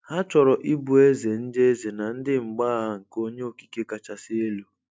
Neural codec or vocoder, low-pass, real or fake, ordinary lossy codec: none; none; real; none